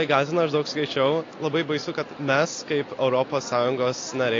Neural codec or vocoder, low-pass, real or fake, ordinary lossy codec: none; 7.2 kHz; real; AAC, 32 kbps